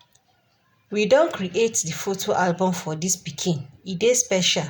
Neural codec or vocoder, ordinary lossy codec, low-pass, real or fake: none; none; none; real